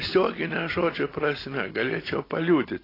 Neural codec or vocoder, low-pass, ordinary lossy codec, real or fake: none; 5.4 kHz; AAC, 24 kbps; real